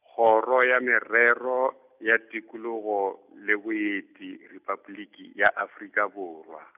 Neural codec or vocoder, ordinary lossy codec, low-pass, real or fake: none; none; 3.6 kHz; real